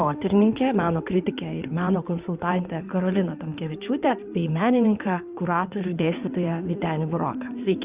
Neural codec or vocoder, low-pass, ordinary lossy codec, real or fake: codec, 16 kHz in and 24 kHz out, 2.2 kbps, FireRedTTS-2 codec; 3.6 kHz; Opus, 64 kbps; fake